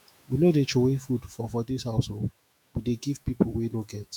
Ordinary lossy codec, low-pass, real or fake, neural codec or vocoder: none; 19.8 kHz; fake; autoencoder, 48 kHz, 128 numbers a frame, DAC-VAE, trained on Japanese speech